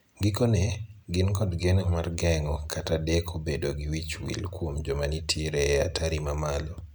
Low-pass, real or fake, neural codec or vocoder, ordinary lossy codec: none; real; none; none